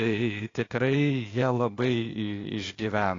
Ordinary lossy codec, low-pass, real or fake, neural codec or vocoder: AAC, 32 kbps; 7.2 kHz; fake; codec, 16 kHz, 0.8 kbps, ZipCodec